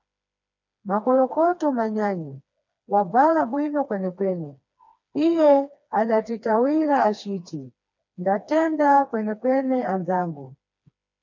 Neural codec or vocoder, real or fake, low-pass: codec, 16 kHz, 2 kbps, FreqCodec, smaller model; fake; 7.2 kHz